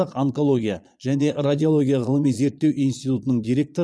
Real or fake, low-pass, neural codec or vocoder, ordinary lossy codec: fake; none; vocoder, 22.05 kHz, 80 mel bands, Vocos; none